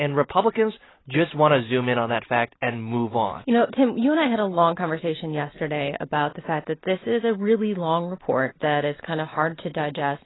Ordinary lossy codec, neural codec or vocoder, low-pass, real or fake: AAC, 16 kbps; none; 7.2 kHz; real